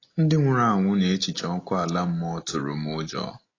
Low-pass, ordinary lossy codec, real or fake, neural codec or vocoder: 7.2 kHz; AAC, 32 kbps; real; none